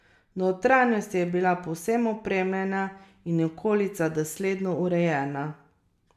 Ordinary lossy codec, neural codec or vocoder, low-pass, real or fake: MP3, 96 kbps; none; 14.4 kHz; real